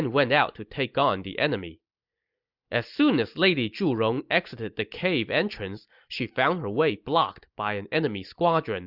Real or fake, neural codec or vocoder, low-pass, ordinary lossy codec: real; none; 5.4 kHz; Opus, 64 kbps